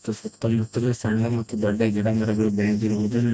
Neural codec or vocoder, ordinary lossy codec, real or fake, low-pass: codec, 16 kHz, 1 kbps, FreqCodec, smaller model; none; fake; none